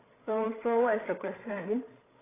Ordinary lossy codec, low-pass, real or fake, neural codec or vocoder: AAC, 16 kbps; 3.6 kHz; fake; codec, 16 kHz, 16 kbps, FreqCodec, larger model